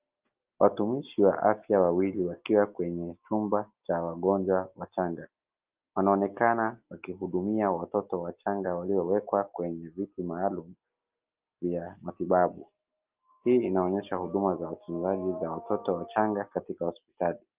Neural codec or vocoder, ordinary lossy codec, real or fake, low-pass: none; Opus, 32 kbps; real; 3.6 kHz